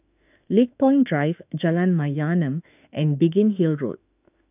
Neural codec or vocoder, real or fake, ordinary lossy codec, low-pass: autoencoder, 48 kHz, 32 numbers a frame, DAC-VAE, trained on Japanese speech; fake; none; 3.6 kHz